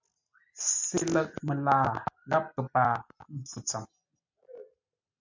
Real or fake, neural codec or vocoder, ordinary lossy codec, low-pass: real; none; MP3, 64 kbps; 7.2 kHz